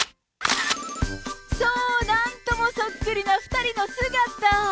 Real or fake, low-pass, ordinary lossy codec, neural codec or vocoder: real; none; none; none